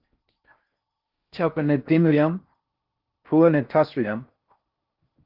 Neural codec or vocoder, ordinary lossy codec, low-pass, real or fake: codec, 16 kHz in and 24 kHz out, 0.6 kbps, FocalCodec, streaming, 4096 codes; Opus, 32 kbps; 5.4 kHz; fake